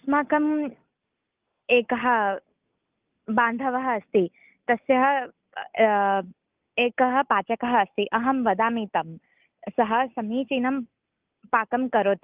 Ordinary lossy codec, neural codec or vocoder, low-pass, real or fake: Opus, 24 kbps; none; 3.6 kHz; real